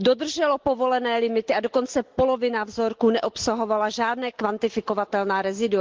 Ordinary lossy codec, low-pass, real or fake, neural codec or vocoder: Opus, 16 kbps; 7.2 kHz; real; none